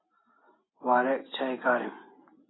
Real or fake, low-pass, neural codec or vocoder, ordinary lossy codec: real; 7.2 kHz; none; AAC, 16 kbps